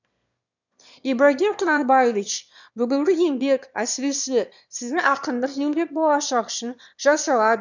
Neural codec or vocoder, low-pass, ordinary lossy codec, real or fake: autoencoder, 22.05 kHz, a latent of 192 numbers a frame, VITS, trained on one speaker; 7.2 kHz; none; fake